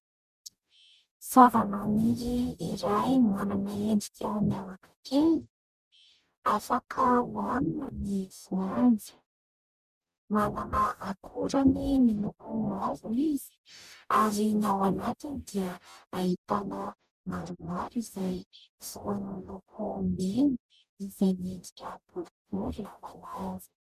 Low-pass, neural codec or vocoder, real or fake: 14.4 kHz; codec, 44.1 kHz, 0.9 kbps, DAC; fake